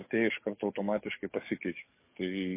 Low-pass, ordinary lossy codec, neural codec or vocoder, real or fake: 3.6 kHz; MP3, 24 kbps; codec, 44.1 kHz, 7.8 kbps, DAC; fake